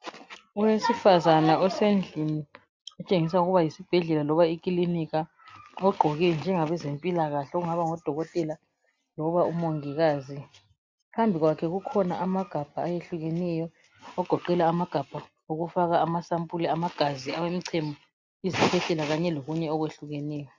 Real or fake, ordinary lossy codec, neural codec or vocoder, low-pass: real; MP3, 64 kbps; none; 7.2 kHz